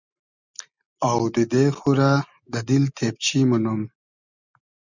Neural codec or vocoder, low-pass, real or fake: none; 7.2 kHz; real